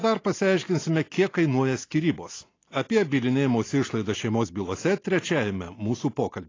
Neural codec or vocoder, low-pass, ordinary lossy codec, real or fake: none; 7.2 kHz; AAC, 32 kbps; real